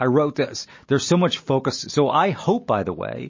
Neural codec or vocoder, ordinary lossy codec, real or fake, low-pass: none; MP3, 32 kbps; real; 7.2 kHz